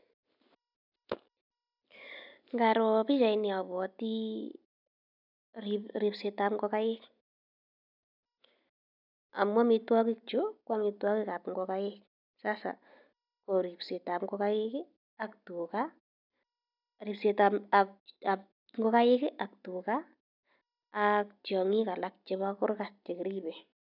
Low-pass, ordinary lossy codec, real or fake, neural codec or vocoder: 5.4 kHz; none; real; none